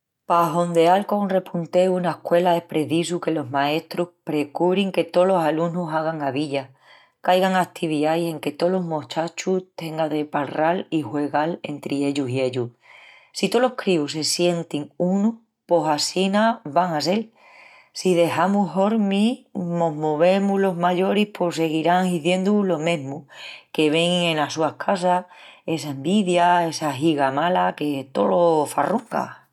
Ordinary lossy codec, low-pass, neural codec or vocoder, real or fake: none; 19.8 kHz; none; real